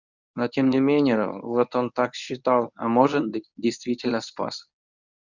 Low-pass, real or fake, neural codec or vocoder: 7.2 kHz; fake; codec, 24 kHz, 0.9 kbps, WavTokenizer, medium speech release version 1